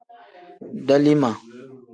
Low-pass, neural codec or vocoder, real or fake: 9.9 kHz; none; real